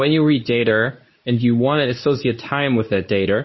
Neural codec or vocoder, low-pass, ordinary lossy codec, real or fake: codec, 24 kHz, 0.9 kbps, WavTokenizer, medium speech release version 2; 7.2 kHz; MP3, 24 kbps; fake